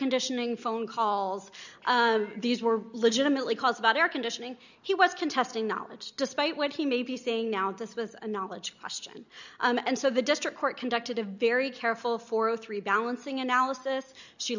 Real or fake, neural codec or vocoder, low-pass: real; none; 7.2 kHz